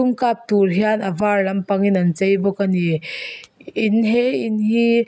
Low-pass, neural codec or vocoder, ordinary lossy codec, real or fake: none; none; none; real